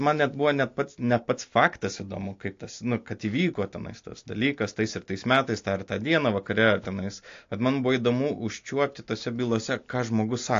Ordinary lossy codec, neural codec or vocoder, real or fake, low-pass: AAC, 48 kbps; none; real; 7.2 kHz